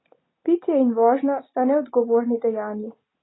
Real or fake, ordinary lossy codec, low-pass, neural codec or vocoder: real; AAC, 16 kbps; 7.2 kHz; none